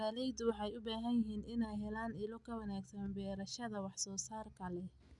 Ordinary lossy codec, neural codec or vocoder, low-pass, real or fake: none; none; 10.8 kHz; real